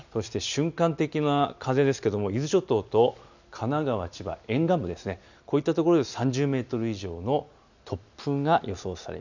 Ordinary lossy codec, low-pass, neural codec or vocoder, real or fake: none; 7.2 kHz; none; real